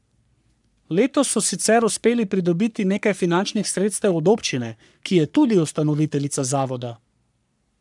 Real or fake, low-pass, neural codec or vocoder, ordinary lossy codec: fake; 10.8 kHz; codec, 44.1 kHz, 3.4 kbps, Pupu-Codec; none